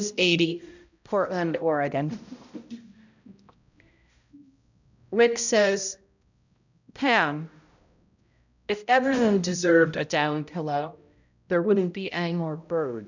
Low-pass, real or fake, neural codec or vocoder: 7.2 kHz; fake; codec, 16 kHz, 0.5 kbps, X-Codec, HuBERT features, trained on balanced general audio